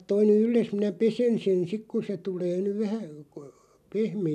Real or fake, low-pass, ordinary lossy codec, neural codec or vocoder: real; 14.4 kHz; none; none